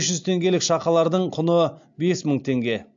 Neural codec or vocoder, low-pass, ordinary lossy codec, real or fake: none; 7.2 kHz; AAC, 64 kbps; real